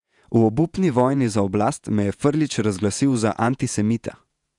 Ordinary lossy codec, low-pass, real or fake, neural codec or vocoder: none; 10.8 kHz; fake; vocoder, 48 kHz, 128 mel bands, Vocos